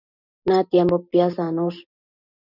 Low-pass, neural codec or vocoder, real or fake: 5.4 kHz; none; real